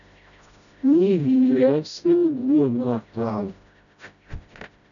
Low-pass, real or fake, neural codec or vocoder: 7.2 kHz; fake; codec, 16 kHz, 0.5 kbps, FreqCodec, smaller model